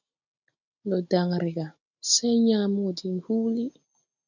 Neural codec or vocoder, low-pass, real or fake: none; 7.2 kHz; real